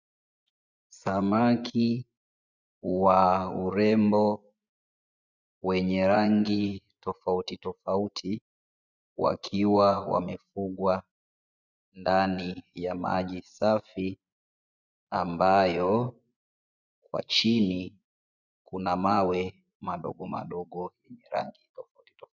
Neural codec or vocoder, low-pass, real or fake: vocoder, 24 kHz, 100 mel bands, Vocos; 7.2 kHz; fake